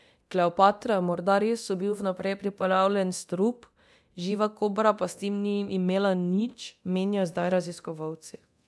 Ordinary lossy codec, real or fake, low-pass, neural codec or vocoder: none; fake; none; codec, 24 kHz, 0.9 kbps, DualCodec